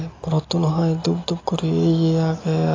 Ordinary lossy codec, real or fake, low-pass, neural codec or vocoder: AAC, 32 kbps; real; 7.2 kHz; none